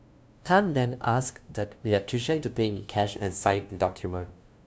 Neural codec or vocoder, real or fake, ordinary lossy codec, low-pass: codec, 16 kHz, 0.5 kbps, FunCodec, trained on LibriTTS, 25 frames a second; fake; none; none